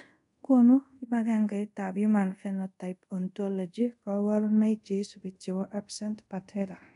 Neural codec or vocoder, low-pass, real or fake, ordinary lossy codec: codec, 24 kHz, 0.5 kbps, DualCodec; 10.8 kHz; fake; none